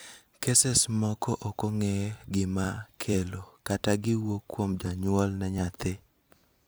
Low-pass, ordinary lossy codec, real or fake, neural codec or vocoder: none; none; fake; vocoder, 44.1 kHz, 128 mel bands every 256 samples, BigVGAN v2